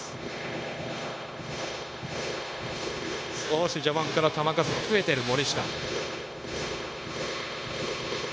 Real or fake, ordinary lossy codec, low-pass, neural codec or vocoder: fake; none; none; codec, 16 kHz, 0.9 kbps, LongCat-Audio-Codec